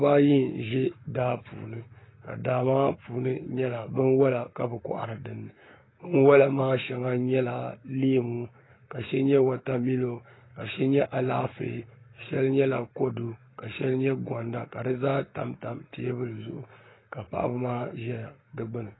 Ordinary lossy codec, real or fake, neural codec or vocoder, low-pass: AAC, 16 kbps; fake; codec, 44.1 kHz, 7.8 kbps, Pupu-Codec; 7.2 kHz